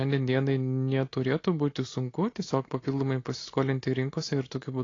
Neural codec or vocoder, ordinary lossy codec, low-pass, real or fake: none; AAC, 32 kbps; 7.2 kHz; real